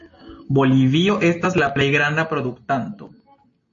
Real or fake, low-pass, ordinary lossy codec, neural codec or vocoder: real; 7.2 kHz; MP3, 32 kbps; none